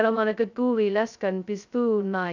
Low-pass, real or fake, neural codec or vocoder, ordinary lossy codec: 7.2 kHz; fake; codec, 16 kHz, 0.2 kbps, FocalCodec; none